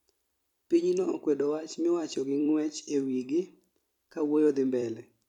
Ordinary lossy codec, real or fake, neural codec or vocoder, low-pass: none; fake; vocoder, 44.1 kHz, 128 mel bands every 256 samples, BigVGAN v2; 19.8 kHz